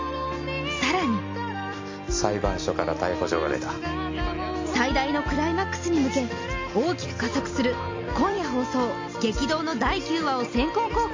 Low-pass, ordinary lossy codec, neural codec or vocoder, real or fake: 7.2 kHz; MP3, 48 kbps; none; real